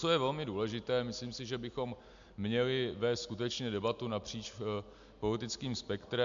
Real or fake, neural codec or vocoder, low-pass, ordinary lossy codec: real; none; 7.2 kHz; MP3, 64 kbps